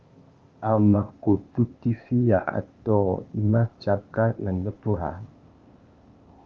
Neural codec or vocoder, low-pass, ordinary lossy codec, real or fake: codec, 16 kHz, 0.8 kbps, ZipCodec; 7.2 kHz; Opus, 32 kbps; fake